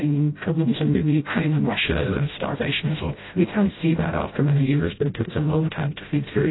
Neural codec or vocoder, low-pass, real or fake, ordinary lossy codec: codec, 16 kHz, 0.5 kbps, FreqCodec, smaller model; 7.2 kHz; fake; AAC, 16 kbps